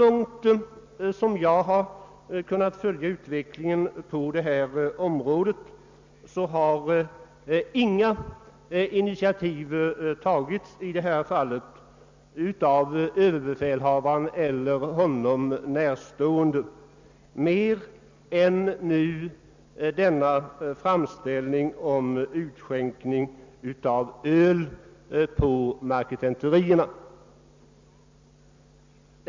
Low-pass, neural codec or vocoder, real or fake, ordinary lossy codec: 7.2 kHz; none; real; MP3, 64 kbps